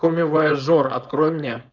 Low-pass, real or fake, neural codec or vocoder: 7.2 kHz; fake; codec, 16 kHz, 4.8 kbps, FACodec